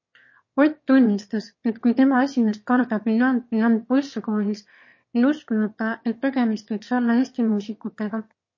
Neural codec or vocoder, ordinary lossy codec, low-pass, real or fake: autoencoder, 22.05 kHz, a latent of 192 numbers a frame, VITS, trained on one speaker; MP3, 32 kbps; 7.2 kHz; fake